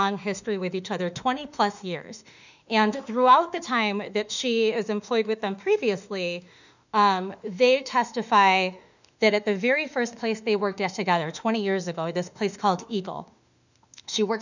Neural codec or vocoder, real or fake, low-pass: autoencoder, 48 kHz, 32 numbers a frame, DAC-VAE, trained on Japanese speech; fake; 7.2 kHz